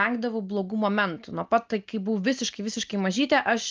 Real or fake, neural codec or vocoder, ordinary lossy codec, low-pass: real; none; Opus, 24 kbps; 7.2 kHz